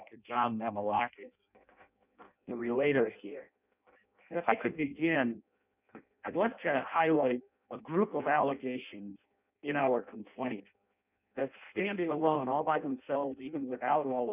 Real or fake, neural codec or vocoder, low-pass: fake; codec, 16 kHz in and 24 kHz out, 0.6 kbps, FireRedTTS-2 codec; 3.6 kHz